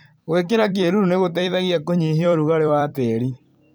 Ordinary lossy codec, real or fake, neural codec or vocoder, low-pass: none; fake; vocoder, 44.1 kHz, 128 mel bands every 256 samples, BigVGAN v2; none